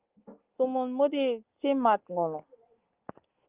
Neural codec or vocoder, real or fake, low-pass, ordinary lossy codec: none; real; 3.6 kHz; Opus, 32 kbps